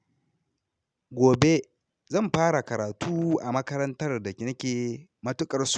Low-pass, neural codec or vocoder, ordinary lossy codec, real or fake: 9.9 kHz; none; none; real